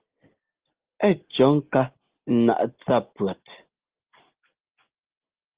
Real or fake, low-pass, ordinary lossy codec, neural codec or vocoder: real; 3.6 kHz; Opus, 16 kbps; none